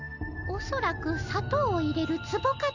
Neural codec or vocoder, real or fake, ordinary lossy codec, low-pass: none; real; none; 7.2 kHz